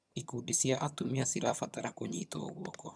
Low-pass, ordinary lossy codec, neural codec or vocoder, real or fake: none; none; vocoder, 22.05 kHz, 80 mel bands, HiFi-GAN; fake